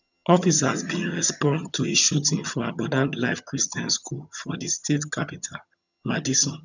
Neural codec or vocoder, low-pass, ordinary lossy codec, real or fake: vocoder, 22.05 kHz, 80 mel bands, HiFi-GAN; 7.2 kHz; none; fake